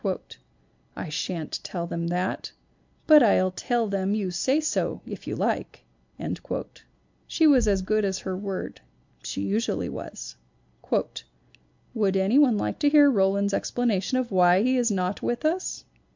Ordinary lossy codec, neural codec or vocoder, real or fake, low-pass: MP3, 64 kbps; none; real; 7.2 kHz